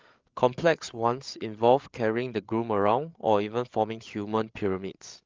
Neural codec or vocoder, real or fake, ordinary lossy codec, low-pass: codec, 16 kHz, 8 kbps, FreqCodec, larger model; fake; Opus, 32 kbps; 7.2 kHz